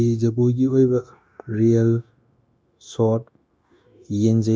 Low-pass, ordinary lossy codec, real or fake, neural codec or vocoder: none; none; fake; codec, 16 kHz, 0.9 kbps, LongCat-Audio-Codec